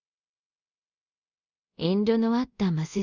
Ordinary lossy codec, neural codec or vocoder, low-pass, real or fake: Opus, 64 kbps; codec, 24 kHz, 0.5 kbps, DualCodec; 7.2 kHz; fake